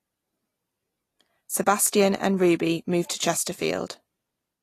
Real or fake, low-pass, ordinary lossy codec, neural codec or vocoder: real; 14.4 kHz; AAC, 48 kbps; none